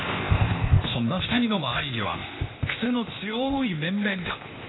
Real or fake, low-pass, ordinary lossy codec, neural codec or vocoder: fake; 7.2 kHz; AAC, 16 kbps; codec, 16 kHz, 0.8 kbps, ZipCodec